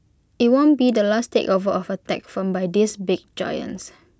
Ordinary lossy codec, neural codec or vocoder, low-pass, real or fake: none; none; none; real